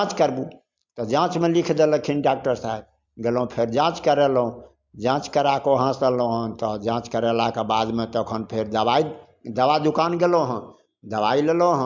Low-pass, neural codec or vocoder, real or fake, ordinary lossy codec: 7.2 kHz; none; real; MP3, 64 kbps